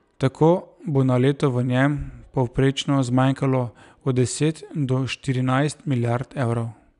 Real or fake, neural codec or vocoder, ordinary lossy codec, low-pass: real; none; none; 10.8 kHz